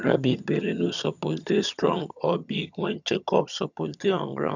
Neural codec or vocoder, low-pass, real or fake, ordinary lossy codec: vocoder, 22.05 kHz, 80 mel bands, HiFi-GAN; 7.2 kHz; fake; none